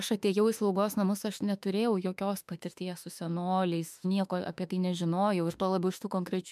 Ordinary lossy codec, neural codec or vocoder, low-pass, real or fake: MP3, 96 kbps; autoencoder, 48 kHz, 32 numbers a frame, DAC-VAE, trained on Japanese speech; 14.4 kHz; fake